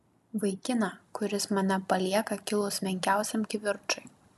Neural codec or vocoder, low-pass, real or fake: none; 14.4 kHz; real